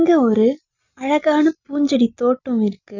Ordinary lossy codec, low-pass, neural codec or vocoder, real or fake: none; 7.2 kHz; none; real